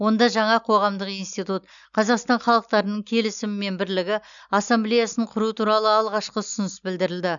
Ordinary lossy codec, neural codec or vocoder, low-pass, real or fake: none; none; 7.2 kHz; real